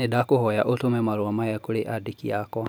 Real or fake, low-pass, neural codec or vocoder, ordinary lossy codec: real; none; none; none